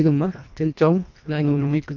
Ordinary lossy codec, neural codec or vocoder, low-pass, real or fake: none; codec, 24 kHz, 1.5 kbps, HILCodec; 7.2 kHz; fake